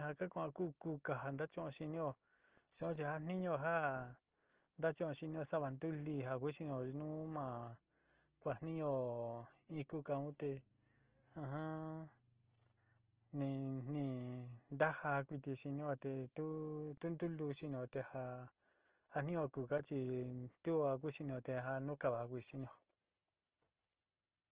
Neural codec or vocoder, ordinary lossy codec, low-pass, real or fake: none; Opus, 16 kbps; 3.6 kHz; real